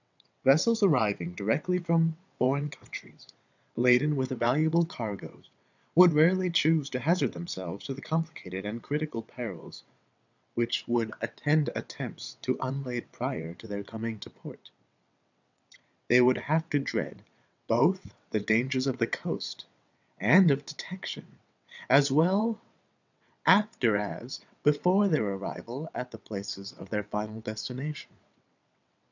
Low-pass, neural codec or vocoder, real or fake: 7.2 kHz; vocoder, 22.05 kHz, 80 mel bands, WaveNeXt; fake